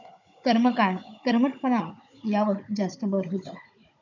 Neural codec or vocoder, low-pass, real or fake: codec, 16 kHz, 16 kbps, FunCodec, trained on Chinese and English, 50 frames a second; 7.2 kHz; fake